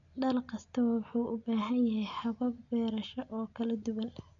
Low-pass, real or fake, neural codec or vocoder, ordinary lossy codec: 7.2 kHz; real; none; none